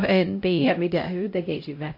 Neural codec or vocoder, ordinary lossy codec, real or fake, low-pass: codec, 16 kHz, 0.5 kbps, X-Codec, HuBERT features, trained on LibriSpeech; MP3, 32 kbps; fake; 5.4 kHz